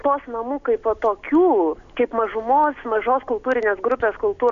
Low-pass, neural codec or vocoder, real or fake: 7.2 kHz; none; real